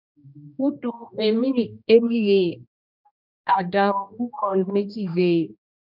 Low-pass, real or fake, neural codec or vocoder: 5.4 kHz; fake; codec, 16 kHz, 1 kbps, X-Codec, HuBERT features, trained on general audio